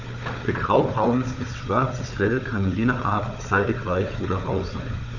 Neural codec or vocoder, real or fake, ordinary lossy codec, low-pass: codec, 16 kHz, 4 kbps, FunCodec, trained on Chinese and English, 50 frames a second; fake; none; 7.2 kHz